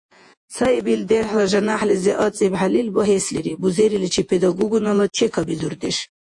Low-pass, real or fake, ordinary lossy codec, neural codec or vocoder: 10.8 kHz; fake; AAC, 64 kbps; vocoder, 48 kHz, 128 mel bands, Vocos